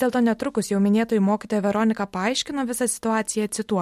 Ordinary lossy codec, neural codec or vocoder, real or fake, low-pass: MP3, 64 kbps; none; real; 19.8 kHz